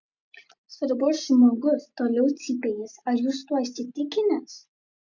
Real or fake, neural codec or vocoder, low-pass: real; none; 7.2 kHz